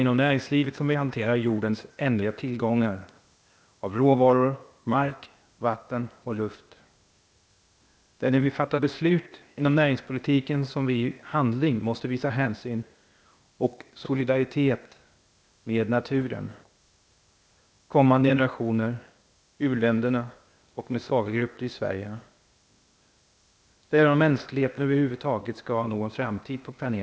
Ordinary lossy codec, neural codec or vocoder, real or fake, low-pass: none; codec, 16 kHz, 0.8 kbps, ZipCodec; fake; none